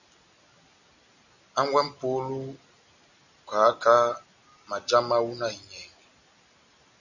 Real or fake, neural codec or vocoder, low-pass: real; none; 7.2 kHz